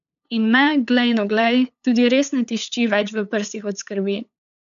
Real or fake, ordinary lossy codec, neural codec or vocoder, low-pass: fake; none; codec, 16 kHz, 8 kbps, FunCodec, trained on LibriTTS, 25 frames a second; 7.2 kHz